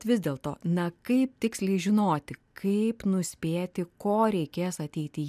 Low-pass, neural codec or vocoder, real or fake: 14.4 kHz; none; real